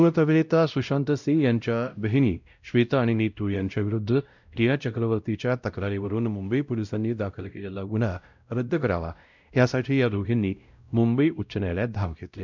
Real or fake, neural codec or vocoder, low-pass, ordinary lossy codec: fake; codec, 16 kHz, 0.5 kbps, X-Codec, WavLM features, trained on Multilingual LibriSpeech; 7.2 kHz; none